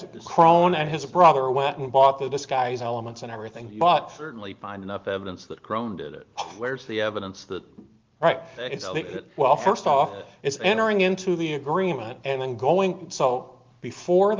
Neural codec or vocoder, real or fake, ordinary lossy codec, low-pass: none; real; Opus, 32 kbps; 7.2 kHz